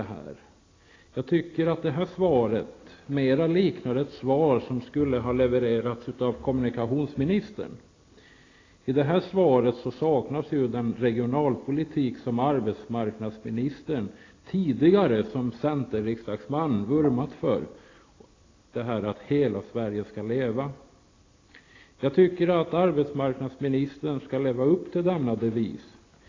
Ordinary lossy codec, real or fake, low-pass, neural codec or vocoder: AAC, 32 kbps; real; 7.2 kHz; none